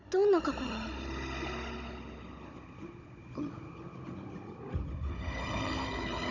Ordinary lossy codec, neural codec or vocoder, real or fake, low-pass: none; codec, 16 kHz, 16 kbps, FunCodec, trained on Chinese and English, 50 frames a second; fake; 7.2 kHz